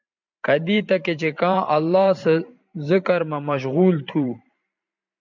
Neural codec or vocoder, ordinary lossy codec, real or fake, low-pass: vocoder, 44.1 kHz, 128 mel bands every 512 samples, BigVGAN v2; MP3, 64 kbps; fake; 7.2 kHz